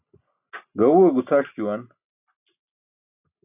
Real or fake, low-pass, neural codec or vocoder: real; 3.6 kHz; none